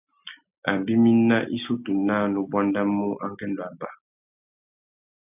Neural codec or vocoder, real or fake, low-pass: none; real; 3.6 kHz